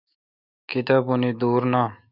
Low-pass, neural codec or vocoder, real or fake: 5.4 kHz; autoencoder, 48 kHz, 128 numbers a frame, DAC-VAE, trained on Japanese speech; fake